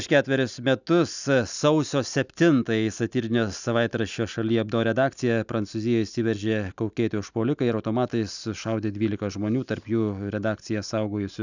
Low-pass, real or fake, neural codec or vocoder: 7.2 kHz; real; none